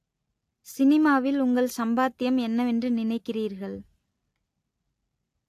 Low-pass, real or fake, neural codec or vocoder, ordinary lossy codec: 14.4 kHz; real; none; AAC, 64 kbps